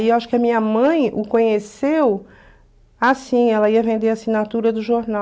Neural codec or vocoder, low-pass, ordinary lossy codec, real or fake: none; none; none; real